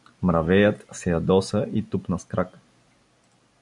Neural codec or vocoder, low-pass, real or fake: none; 10.8 kHz; real